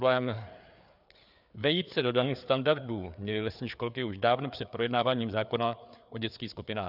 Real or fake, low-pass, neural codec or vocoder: fake; 5.4 kHz; codec, 16 kHz, 4 kbps, FreqCodec, larger model